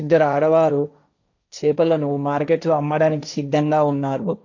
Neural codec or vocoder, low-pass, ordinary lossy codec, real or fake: codec, 16 kHz, 1.1 kbps, Voila-Tokenizer; 7.2 kHz; none; fake